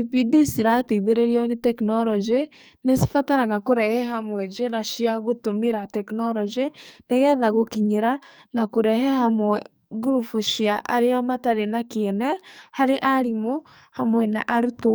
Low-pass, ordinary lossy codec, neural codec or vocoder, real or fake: none; none; codec, 44.1 kHz, 2.6 kbps, SNAC; fake